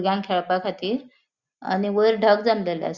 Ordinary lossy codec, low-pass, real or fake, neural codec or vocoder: Opus, 64 kbps; 7.2 kHz; real; none